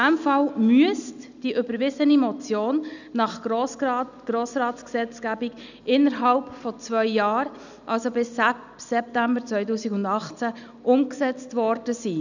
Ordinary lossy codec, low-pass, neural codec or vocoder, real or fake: none; 7.2 kHz; none; real